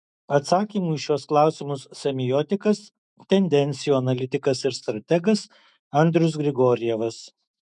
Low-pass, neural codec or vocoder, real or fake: 10.8 kHz; autoencoder, 48 kHz, 128 numbers a frame, DAC-VAE, trained on Japanese speech; fake